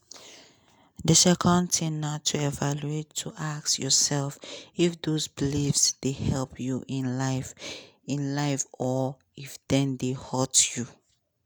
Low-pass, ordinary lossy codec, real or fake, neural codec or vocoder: none; none; real; none